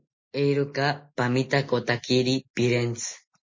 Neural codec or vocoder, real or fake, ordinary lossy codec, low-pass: none; real; MP3, 32 kbps; 7.2 kHz